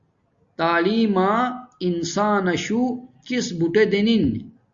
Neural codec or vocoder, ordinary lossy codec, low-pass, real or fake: none; Opus, 64 kbps; 7.2 kHz; real